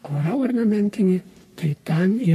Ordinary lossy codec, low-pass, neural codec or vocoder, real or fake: MP3, 64 kbps; 14.4 kHz; codec, 44.1 kHz, 3.4 kbps, Pupu-Codec; fake